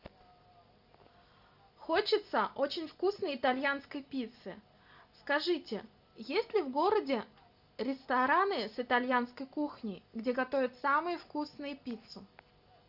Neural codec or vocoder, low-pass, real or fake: none; 5.4 kHz; real